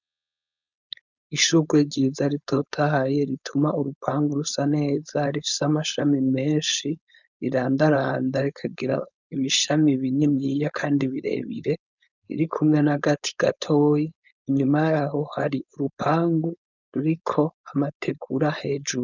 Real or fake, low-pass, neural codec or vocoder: fake; 7.2 kHz; codec, 16 kHz, 4.8 kbps, FACodec